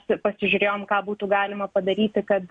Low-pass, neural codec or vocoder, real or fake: 9.9 kHz; none; real